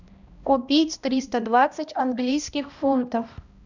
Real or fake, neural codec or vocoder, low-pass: fake; codec, 16 kHz, 1 kbps, X-Codec, HuBERT features, trained on balanced general audio; 7.2 kHz